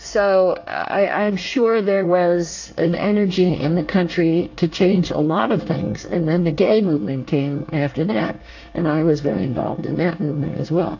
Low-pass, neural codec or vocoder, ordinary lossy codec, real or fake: 7.2 kHz; codec, 24 kHz, 1 kbps, SNAC; AAC, 48 kbps; fake